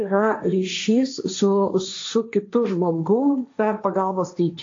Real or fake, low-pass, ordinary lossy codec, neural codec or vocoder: fake; 7.2 kHz; AAC, 48 kbps; codec, 16 kHz, 1.1 kbps, Voila-Tokenizer